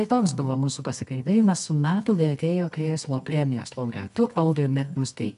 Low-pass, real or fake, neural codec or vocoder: 10.8 kHz; fake; codec, 24 kHz, 0.9 kbps, WavTokenizer, medium music audio release